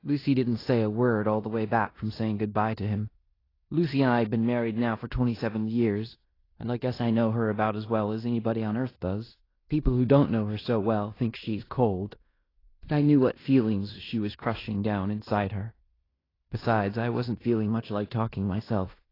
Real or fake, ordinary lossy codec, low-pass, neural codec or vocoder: fake; AAC, 24 kbps; 5.4 kHz; codec, 16 kHz in and 24 kHz out, 0.9 kbps, LongCat-Audio-Codec, fine tuned four codebook decoder